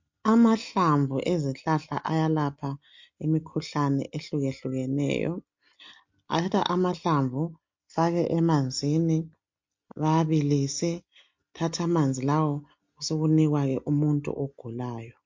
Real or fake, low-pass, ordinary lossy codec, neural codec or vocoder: real; 7.2 kHz; MP3, 48 kbps; none